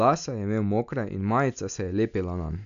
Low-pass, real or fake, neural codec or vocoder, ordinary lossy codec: 7.2 kHz; real; none; none